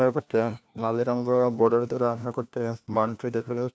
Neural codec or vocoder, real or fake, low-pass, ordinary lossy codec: codec, 16 kHz, 1 kbps, FunCodec, trained on LibriTTS, 50 frames a second; fake; none; none